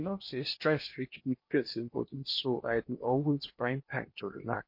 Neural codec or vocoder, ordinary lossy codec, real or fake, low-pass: codec, 16 kHz in and 24 kHz out, 0.8 kbps, FocalCodec, streaming, 65536 codes; MP3, 32 kbps; fake; 5.4 kHz